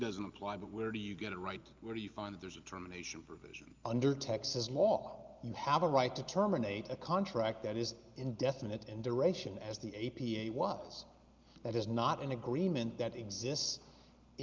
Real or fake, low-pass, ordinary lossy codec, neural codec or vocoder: real; 7.2 kHz; Opus, 32 kbps; none